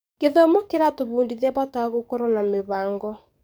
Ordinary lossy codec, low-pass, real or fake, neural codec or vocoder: none; none; fake; codec, 44.1 kHz, 7.8 kbps, DAC